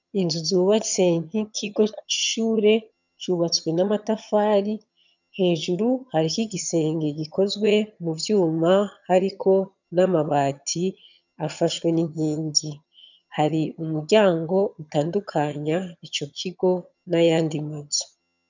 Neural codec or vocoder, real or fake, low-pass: vocoder, 22.05 kHz, 80 mel bands, HiFi-GAN; fake; 7.2 kHz